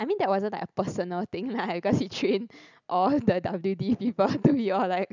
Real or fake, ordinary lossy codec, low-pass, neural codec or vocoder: real; none; 7.2 kHz; none